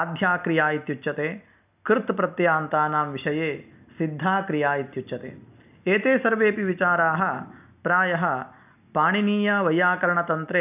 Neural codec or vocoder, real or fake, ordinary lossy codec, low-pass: none; real; none; 3.6 kHz